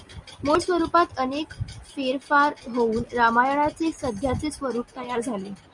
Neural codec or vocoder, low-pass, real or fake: none; 10.8 kHz; real